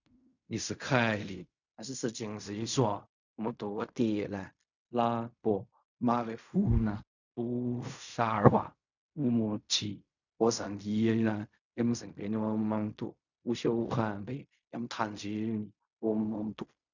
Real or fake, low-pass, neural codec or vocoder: fake; 7.2 kHz; codec, 16 kHz in and 24 kHz out, 0.4 kbps, LongCat-Audio-Codec, fine tuned four codebook decoder